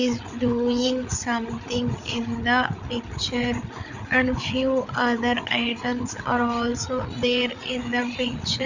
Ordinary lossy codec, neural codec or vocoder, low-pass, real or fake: none; codec, 16 kHz, 8 kbps, FreqCodec, larger model; 7.2 kHz; fake